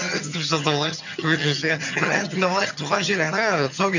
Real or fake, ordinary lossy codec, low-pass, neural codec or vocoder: fake; none; 7.2 kHz; vocoder, 22.05 kHz, 80 mel bands, HiFi-GAN